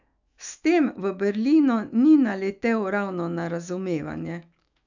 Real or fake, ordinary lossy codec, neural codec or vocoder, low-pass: fake; none; autoencoder, 48 kHz, 128 numbers a frame, DAC-VAE, trained on Japanese speech; 7.2 kHz